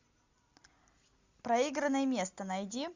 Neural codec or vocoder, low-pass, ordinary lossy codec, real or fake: none; 7.2 kHz; Opus, 64 kbps; real